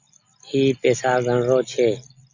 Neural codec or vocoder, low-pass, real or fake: none; 7.2 kHz; real